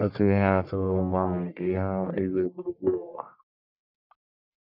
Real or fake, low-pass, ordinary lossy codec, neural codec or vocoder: fake; 5.4 kHz; none; codec, 44.1 kHz, 1.7 kbps, Pupu-Codec